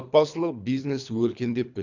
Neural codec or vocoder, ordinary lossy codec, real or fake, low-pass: codec, 24 kHz, 3 kbps, HILCodec; none; fake; 7.2 kHz